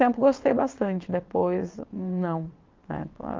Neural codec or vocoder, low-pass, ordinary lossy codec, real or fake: vocoder, 22.05 kHz, 80 mel bands, WaveNeXt; 7.2 kHz; Opus, 24 kbps; fake